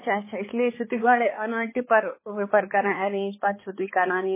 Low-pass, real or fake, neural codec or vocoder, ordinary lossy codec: 3.6 kHz; fake; codec, 16 kHz, 4 kbps, X-Codec, HuBERT features, trained on balanced general audio; MP3, 16 kbps